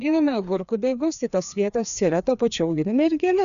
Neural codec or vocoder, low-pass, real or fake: codec, 16 kHz, 2 kbps, FreqCodec, larger model; 7.2 kHz; fake